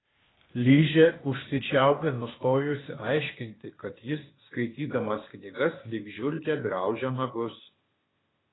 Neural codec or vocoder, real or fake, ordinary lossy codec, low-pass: codec, 16 kHz, 0.8 kbps, ZipCodec; fake; AAC, 16 kbps; 7.2 kHz